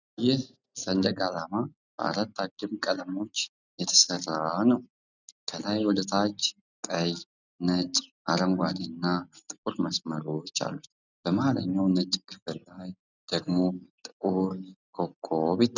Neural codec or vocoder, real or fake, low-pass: none; real; 7.2 kHz